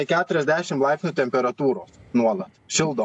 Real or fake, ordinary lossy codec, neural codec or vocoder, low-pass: real; AAC, 64 kbps; none; 10.8 kHz